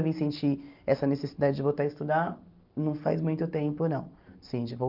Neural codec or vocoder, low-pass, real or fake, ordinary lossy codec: none; 5.4 kHz; real; Opus, 24 kbps